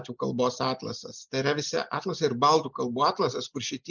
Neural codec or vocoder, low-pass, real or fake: none; 7.2 kHz; real